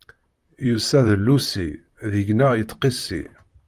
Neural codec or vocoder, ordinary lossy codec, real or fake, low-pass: vocoder, 44.1 kHz, 128 mel bands, Pupu-Vocoder; Opus, 32 kbps; fake; 14.4 kHz